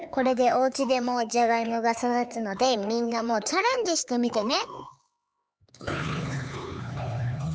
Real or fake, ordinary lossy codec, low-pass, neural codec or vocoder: fake; none; none; codec, 16 kHz, 4 kbps, X-Codec, HuBERT features, trained on LibriSpeech